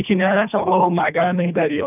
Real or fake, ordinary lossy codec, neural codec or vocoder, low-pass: fake; none; codec, 24 kHz, 1.5 kbps, HILCodec; 3.6 kHz